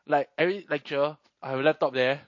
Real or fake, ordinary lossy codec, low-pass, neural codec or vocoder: real; MP3, 32 kbps; 7.2 kHz; none